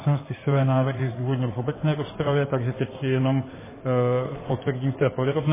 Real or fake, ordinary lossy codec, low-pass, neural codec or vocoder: fake; MP3, 16 kbps; 3.6 kHz; codec, 16 kHz in and 24 kHz out, 2.2 kbps, FireRedTTS-2 codec